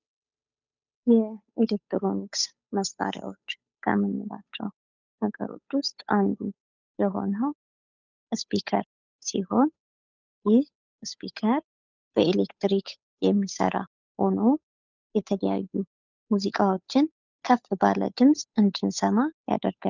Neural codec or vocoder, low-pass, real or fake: codec, 16 kHz, 8 kbps, FunCodec, trained on Chinese and English, 25 frames a second; 7.2 kHz; fake